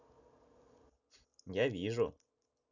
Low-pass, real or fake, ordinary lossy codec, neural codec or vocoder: 7.2 kHz; real; none; none